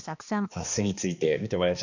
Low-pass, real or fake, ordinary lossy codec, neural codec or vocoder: 7.2 kHz; fake; none; codec, 16 kHz, 2 kbps, X-Codec, HuBERT features, trained on balanced general audio